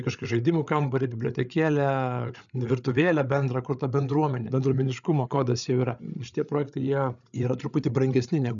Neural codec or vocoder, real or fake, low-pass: codec, 16 kHz, 8 kbps, FreqCodec, larger model; fake; 7.2 kHz